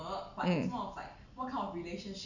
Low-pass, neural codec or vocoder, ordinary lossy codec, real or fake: 7.2 kHz; none; none; real